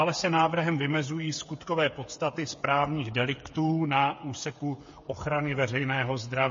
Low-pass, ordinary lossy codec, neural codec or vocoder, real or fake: 7.2 kHz; MP3, 32 kbps; codec, 16 kHz, 8 kbps, FreqCodec, smaller model; fake